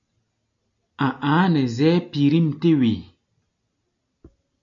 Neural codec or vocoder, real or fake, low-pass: none; real; 7.2 kHz